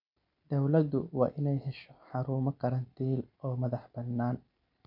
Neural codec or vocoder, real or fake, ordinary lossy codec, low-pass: none; real; none; 5.4 kHz